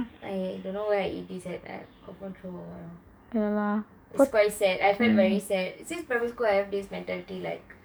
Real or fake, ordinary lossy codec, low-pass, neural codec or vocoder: fake; none; none; codec, 44.1 kHz, 7.8 kbps, DAC